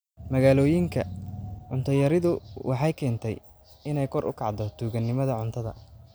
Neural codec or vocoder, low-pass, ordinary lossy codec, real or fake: none; none; none; real